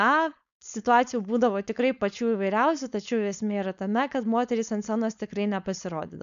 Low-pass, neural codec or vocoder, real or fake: 7.2 kHz; codec, 16 kHz, 4.8 kbps, FACodec; fake